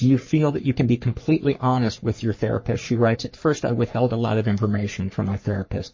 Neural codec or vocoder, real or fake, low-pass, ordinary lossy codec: codec, 44.1 kHz, 3.4 kbps, Pupu-Codec; fake; 7.2 kHz; MP3, 32 kbps